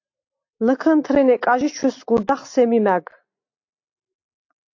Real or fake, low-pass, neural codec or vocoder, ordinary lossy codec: real; 7.2 kHz; none; AAC, 48 kbps